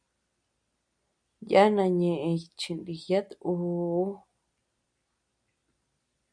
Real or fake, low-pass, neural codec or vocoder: real; 9.9 kHz; none